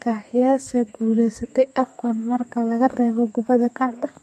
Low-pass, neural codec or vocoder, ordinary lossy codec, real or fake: 14.4 kHz; codec, 32 kHz, 1.9 kbps, SNAC; MP3, 64 kbps; fake